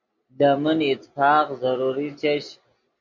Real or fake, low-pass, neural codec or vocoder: real; 7.2 kHz; none